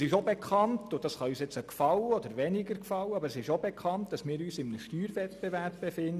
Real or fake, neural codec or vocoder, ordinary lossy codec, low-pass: real; none; none; 14.4 kHz